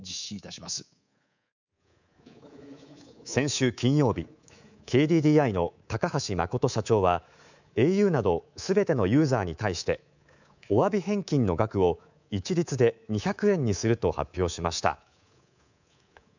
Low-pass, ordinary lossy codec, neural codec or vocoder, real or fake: 7.2 kHz; none; codec, 24 kHz, 3.1 kbps, DualCodec; fake